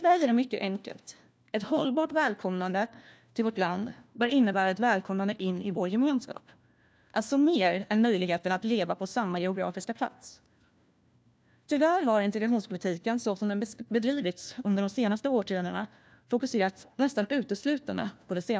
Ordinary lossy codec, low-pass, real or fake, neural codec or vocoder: none; none; fake; codec, 16 kHz, 1 kbps, FunCodec, trained on LibriTTS, 50 frames a second